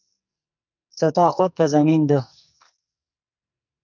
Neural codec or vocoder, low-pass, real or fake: codec, 44.1 kHz, 2.6 kbps, SNAC; 7.2 kHz; fake